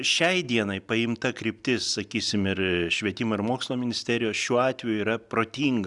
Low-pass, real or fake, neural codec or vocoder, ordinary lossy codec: 10.8 kHz; real; none; Opus, 64 kbps